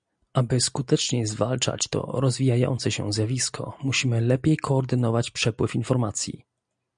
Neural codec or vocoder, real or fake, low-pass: none; real; 9.9 kHz